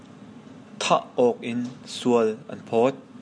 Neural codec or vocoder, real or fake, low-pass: none; real; 9.9 kHz